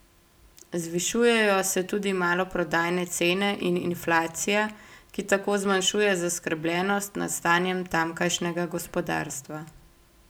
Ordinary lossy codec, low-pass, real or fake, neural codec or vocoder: none; none; real; none